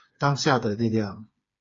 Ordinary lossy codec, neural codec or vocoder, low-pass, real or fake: AAC, 32 kbps; codec, 16 kHz, 4 kbps, FreqCodec, smaller model; 7.2 kHz; fake